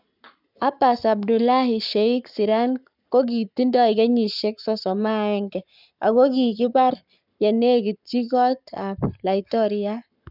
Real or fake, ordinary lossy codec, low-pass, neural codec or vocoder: fake; none; 5.4 kHz; codec, 44.1 kHz, 7.8 kbps, Pupu-Codec